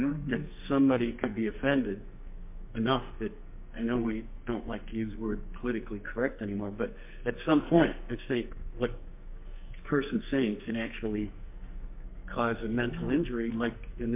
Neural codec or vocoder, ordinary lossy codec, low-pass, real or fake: codec, 44.1 kHz, 2.6 kbps, SNAC; MP3, 32 kbps; 3.6 kHz; fake